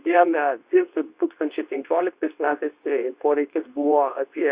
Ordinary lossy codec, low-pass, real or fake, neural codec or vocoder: Opus, 64 kbps; 3.6 kHz; fake; codec, 24 kHz, 0.9 kbps, WavTokenizer, medium speech release version 2